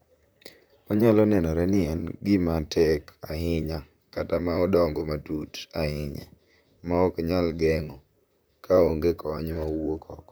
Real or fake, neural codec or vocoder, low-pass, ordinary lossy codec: fake; vocoder, 44.1 kHz, 128 mel bands, Pupu-Vocoder; none; none